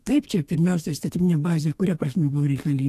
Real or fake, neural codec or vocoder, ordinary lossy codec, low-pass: fake; codec, 32 kHz, 1.9 kbps, SNAC; AAC, 64 kbps; 14.4 kHz